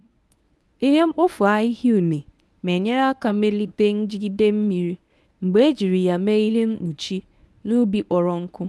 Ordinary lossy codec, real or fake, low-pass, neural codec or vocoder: none; fake; none; codec, 24 kHz, 0.9 kbps, WavTokenizer, medium speech release version 1